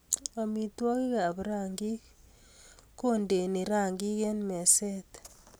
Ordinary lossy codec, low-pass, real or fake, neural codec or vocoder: none; none; real; none